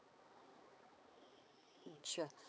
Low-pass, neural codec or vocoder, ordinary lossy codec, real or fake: none; codec, 16 kHz, 4 kbps, X-Codec, HuBERT features, trained on balanced general audio; none; fake